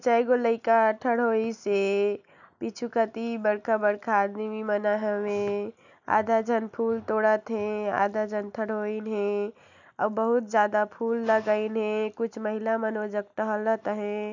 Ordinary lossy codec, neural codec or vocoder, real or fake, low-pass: none; none; real; 7.2 kHz